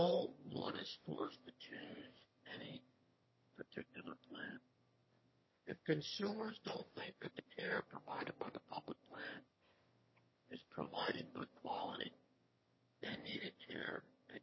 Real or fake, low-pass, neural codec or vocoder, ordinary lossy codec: fake; 7.2 kHz; autoencoder, 22.05 kHz, a latent of 192 numbers a frame, VITS, trained on one speaker; MP3, 24 kbps